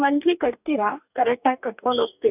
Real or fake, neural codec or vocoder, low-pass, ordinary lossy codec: fake; codec, 44.1 kHz, 2.6 kbps, DAC; 3.6 kHz; none